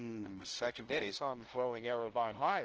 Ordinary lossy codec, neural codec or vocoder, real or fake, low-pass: Opus, 16 kbps; codec, 16 kHz, 0.5 kbps, FunCodec, trained on LibriTTS, 25 frames a second; fake; 7.2 kHz